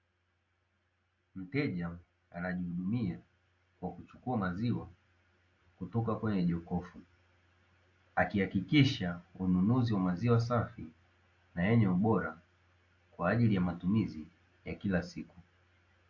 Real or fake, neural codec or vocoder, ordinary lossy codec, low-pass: real; none; AAC, 48 kbps; 7.2 kHz